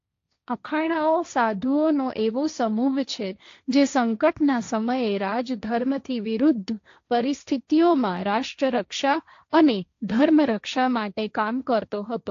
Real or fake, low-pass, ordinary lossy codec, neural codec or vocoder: fake; 7.2 kHz; AAC, 48 kbps; codec, 16 kHz, 1.1 kbps, Voila-Tokenizer